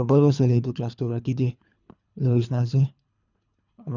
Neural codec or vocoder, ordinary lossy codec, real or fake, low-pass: codec, 24 kHz, 3 kbps, HILCodec; none; fake; 7.2 kHz